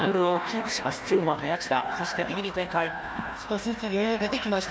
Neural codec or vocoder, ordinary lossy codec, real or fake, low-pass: codec, 16 kHz, 1 kbps, FunCodec, trained on Chinese and English, 50 frames a second; none; fake; none